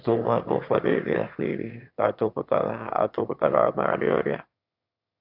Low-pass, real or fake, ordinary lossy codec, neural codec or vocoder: 5.4 kHz; fake; AAC, 48 kbps; autoencoder, 22.05 kHz, a latent of 192 numbers a frame, VITS, trained on one speaker